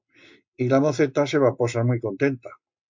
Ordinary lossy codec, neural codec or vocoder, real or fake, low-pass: MP3, 48 kbps; none; real; 7.2 kHz